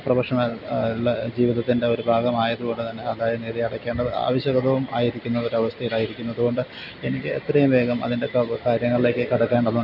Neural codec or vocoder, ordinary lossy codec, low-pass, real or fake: none; none; 5.4 kHz; real